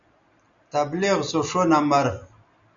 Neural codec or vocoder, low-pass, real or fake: none; 7.2 kHz; real